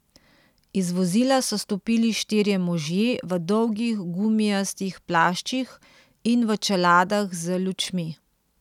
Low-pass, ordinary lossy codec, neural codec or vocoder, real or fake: 19.8 kHz; none; none; real